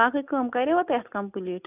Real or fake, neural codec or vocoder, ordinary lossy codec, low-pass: real; none; none; 3.6 kHz